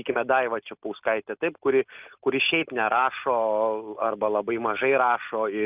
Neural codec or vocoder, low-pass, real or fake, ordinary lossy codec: none; 3.6 kHz; real; Opus, 24 kbps